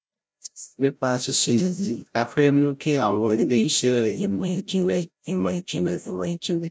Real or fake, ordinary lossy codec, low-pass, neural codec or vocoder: fake; none; none; codec, 16 kHz, 0.5 kbps, FreqCodec, larger model